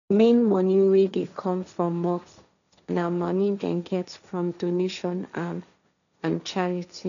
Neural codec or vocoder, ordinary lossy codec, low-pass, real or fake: codec, 16 kHz, 1.1 kbps, Voila-Tokenizer; none; 7.2 kHz; fake